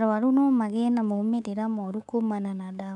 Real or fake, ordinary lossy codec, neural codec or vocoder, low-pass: fake; none; codec, 24 kHz, 3.1 kbps, DualCodec; 10.8 kHz